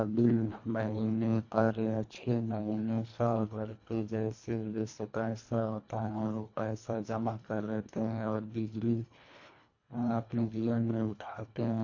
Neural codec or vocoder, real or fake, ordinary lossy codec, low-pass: codec, 24 kHz, 1.5 kbps, HILCodec; fake; AAC, 48 kbps; 7.2 kHz